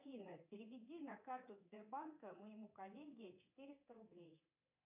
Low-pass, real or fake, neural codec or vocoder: 3.6 kHz; fake; codec, 24 kHz, 3.1 kbps, DualCodec